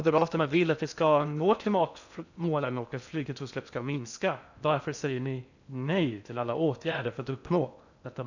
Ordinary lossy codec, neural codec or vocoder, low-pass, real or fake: none; codec, 16 kHz in and 24 kHz out, 0.6 kbps, FocalCodec, streaming, 2048 codes; 7.2 kHz; fake